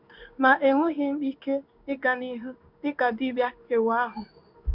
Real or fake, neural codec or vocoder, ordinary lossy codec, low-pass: fake; codec, 16 kHz in and 24 kHz out, 1 kbps, XY-Tokenizer; none; 5.4 kHz